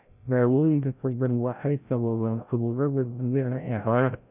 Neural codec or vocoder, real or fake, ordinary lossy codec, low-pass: codec, 16 kHz, 0.5 kbps, FreqCodec, larger model; fake; none; 3.6 kHz